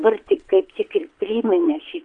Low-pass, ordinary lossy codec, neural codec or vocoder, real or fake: 9.9 kHz; MP3, 96 kbps; vocoder, 22.05 kHz, 80 mel bands, WaveNeXt; fake